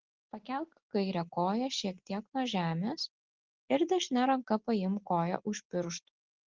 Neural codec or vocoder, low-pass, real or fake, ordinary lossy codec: none; 7.2 kHz; real; Opus, 16 kbps